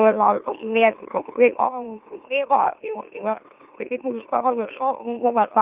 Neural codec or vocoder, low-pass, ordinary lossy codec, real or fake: autoencoder, 44.1 kHz, a latent of 192 numbers a frame, MeloTTS; 3.6 kHz; Opus, 32 kbps; fake